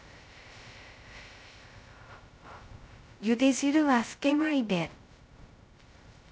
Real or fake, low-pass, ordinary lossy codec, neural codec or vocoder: fake; none; none; codec, 16 kHz, 0.2 kbps, FocalCodec